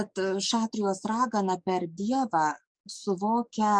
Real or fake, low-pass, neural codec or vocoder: fake; 9.9 kHz; codec, 44.1 kHz, 7.8 kbps, DAC